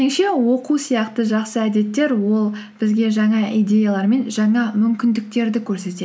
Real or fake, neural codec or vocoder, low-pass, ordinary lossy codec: real; none; none; none